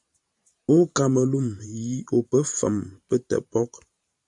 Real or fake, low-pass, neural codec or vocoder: fake; 10.8 kHz; vocoder, 44.1 kHz, 128 mel bands every 512 samples, BigVGAN v2